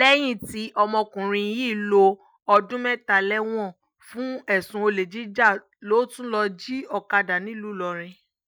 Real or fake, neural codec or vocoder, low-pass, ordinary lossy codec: real; none; none; none